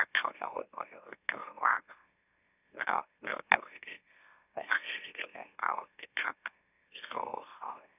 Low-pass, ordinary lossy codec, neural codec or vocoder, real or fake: 3.6 kHz; none; autoencoder, 44.1 kHz, a latent of 192 numbers a frame, MeloTTS; fake